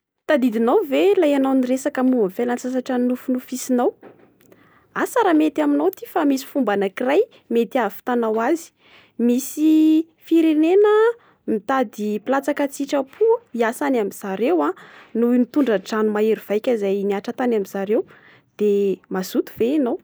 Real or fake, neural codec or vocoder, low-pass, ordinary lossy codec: real; none; none; none